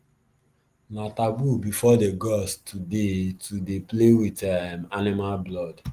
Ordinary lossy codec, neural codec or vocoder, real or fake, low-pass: Opus, 24 kbps; none; real; 14.4 kHz